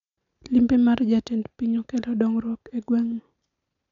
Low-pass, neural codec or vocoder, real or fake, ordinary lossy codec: 7.2 kHz; none; real; none